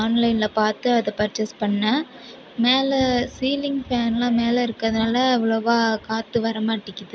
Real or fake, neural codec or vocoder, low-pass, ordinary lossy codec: real; none; none; none